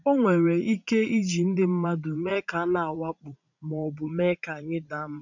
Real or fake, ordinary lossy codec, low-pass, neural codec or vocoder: fake; none; 7.2 kHz; vocoder, 44.1 kHz, 80 mel bands, Vocos